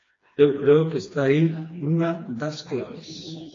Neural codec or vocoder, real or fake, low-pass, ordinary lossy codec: codec, 16 kHz, 2 kbps, FreqCodec, smaller model; fake; 7.2 kHz; AAC, 32 kbps